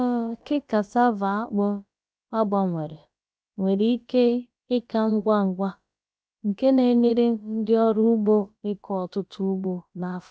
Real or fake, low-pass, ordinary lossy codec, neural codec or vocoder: fake; none; none; codec, 16 kHz, about 1 kbps, DyCAST, with the encoder's durations